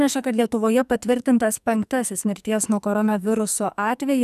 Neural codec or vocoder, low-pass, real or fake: codec, 44.1 kHz, 2.6 kbps, SNAC; 14.4 kHz; fake